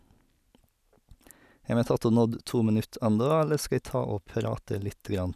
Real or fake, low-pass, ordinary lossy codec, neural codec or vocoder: real; 14.4 kHz; none; none